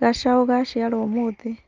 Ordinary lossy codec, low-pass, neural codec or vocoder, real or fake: Opus, 32 kbps; 7.2 kHz; none; real